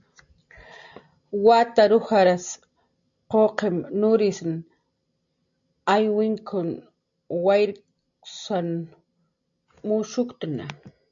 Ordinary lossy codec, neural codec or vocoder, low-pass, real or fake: MP3, 64 kbps; none; 7.2 kHz; real